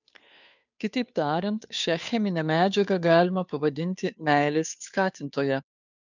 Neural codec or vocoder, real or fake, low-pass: codec, 16 kHz, 2 kbps, FunCodec, trained on Chinese and English, 25 frames a second; fake; 7.2 kHz